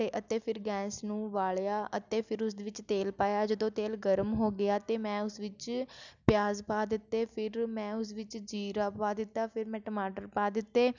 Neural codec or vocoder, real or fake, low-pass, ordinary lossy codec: none; real; 7.2 kHz; none